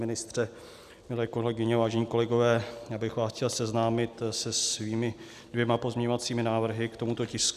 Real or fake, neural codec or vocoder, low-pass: real; none; 14.4 kHz